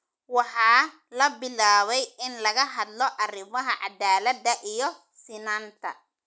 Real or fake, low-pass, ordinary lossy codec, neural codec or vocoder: real; none; none; none